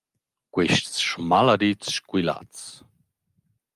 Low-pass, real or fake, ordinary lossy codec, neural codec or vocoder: 14.4 kHz; real; Opus, 32 kbps; none